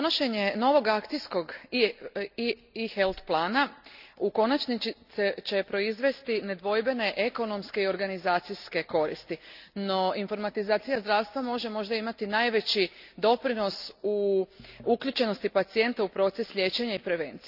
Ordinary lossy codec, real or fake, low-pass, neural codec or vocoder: none; real; 5.4 kHz; none